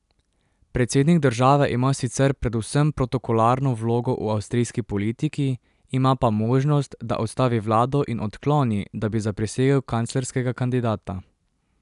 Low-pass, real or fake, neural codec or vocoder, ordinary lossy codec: 10.8 kHz; real; none; none